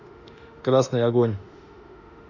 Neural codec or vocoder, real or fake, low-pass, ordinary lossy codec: autoencoder, 48 kHz, 32 numbers a frame, DAC-VAE, trained on Japanese speech; fake; 7.2 kHz; none